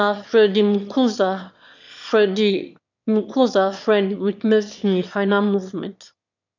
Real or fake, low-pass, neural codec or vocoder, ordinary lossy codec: fake; 7.2 kHz; autoencoder, 22.05 kHz, a latent of 192 numbers a frame, VITS, trained on one speaker; none